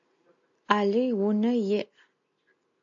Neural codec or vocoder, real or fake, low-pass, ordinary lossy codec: none; real; 7.2 kHz; AAC, 64 kbps